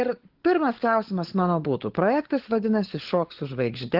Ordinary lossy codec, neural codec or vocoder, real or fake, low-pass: Opus, 16 kbps; codec, 16 kHz, 16 kbps, FunCodec, trained on LibriTTS, 50 frames a second; fake; 5.4 kHz